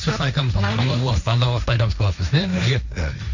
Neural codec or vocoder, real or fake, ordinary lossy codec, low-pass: codec, 16 kHz, 1.1 kbps, Voila-Tokenizer; fake; none; 7.2 kHz